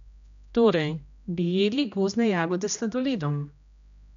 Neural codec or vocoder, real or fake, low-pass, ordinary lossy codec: codec, 16 kHz, 1 kbps, X-Codec, HuBERT features, trained on general audio; fake; 7.2 kHz; none